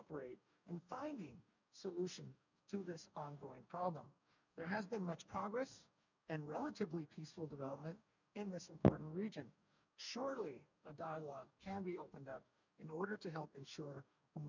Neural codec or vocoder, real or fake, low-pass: codec, 44.1 kHz, 2.6 kbps, DAC; fake; 7.2 kHz